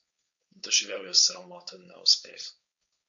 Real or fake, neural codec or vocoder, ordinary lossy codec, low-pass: fake; codec, 16 kHz, 4.8 kbps, FACodec; MP3, 64 kbps; 7.2 kHz